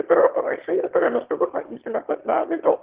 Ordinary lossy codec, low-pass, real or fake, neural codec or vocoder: Opus, 16 kbps; 3.6 kHz; fake; autoencoder, 22.05 kHz, a latent of 192 numbers a frame, VITS, trained on one speaker